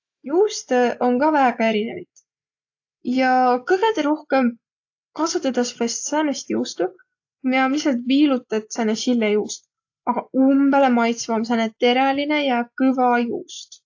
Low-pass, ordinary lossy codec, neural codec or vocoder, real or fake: 7.2 kHz; AAC, 48 kbps; none; real